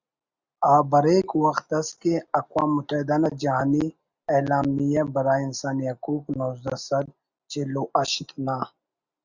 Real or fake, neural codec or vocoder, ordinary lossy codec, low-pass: real; none; Opus, 64 kbps; 7.2 kHz